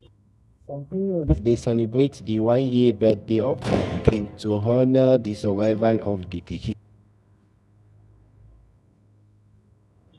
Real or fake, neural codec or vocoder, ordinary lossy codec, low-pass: fake; codec, 24 kHz, 0.9 kbps, WavTokenizer, medium music audio release; none; none